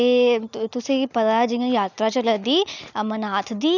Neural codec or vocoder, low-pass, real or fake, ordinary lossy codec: none; 7.2 kHz; real; none